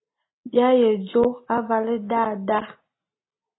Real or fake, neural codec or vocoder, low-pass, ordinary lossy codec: real; none; 7.2 kHz; AAC, 16 kbps